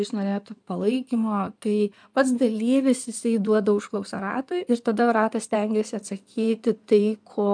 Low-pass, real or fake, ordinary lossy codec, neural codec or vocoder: 9.9 kHz; fake; MP3, 96 kbps; codec, 16 kHz in and 24 kHz out, 2.2 kbps, FireRedTTS-2 codec